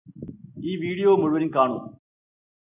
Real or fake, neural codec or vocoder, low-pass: real; none; 3.6 kHz